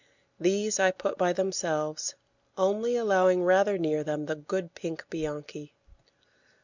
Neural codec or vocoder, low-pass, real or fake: none; 7.2 kHz; real